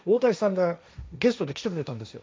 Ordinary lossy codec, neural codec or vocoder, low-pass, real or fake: none; codec, 16 kHz, 1.1 kbps, Voila-Tokenizer; none; fake